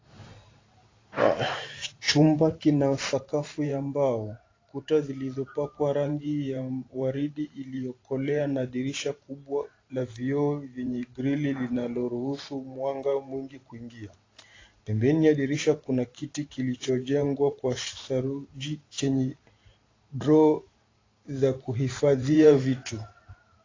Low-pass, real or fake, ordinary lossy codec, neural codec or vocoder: 7.2 kHz; fake; AAC, 32 kbps; vocoder, 44.1 kHz, 128 mel bands every 512 samples, BigVGAN v2